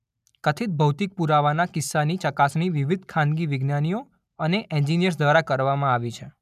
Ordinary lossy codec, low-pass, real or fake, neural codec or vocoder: none; 14.4 kHz; real; none